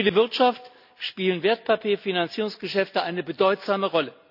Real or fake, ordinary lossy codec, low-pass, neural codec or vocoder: real; none; 5.4 kHz; none